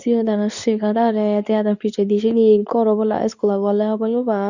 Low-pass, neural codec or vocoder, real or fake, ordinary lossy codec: 7.2 kHz; codec, 24 kHz, 0.9 kbps, WavTokenizer, medium speech release version 2; fake; none